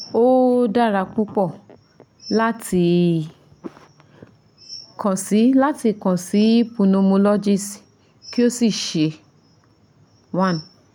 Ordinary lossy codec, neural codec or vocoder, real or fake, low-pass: none; none; real; 19.8 kHz